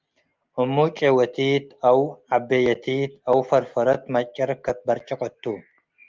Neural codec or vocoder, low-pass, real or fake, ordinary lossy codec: none; 7.2 kHz; real; Opus, 32 kbps